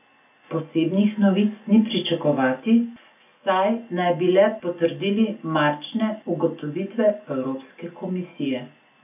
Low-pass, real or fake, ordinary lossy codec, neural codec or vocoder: 3.6 kHz; real; none; none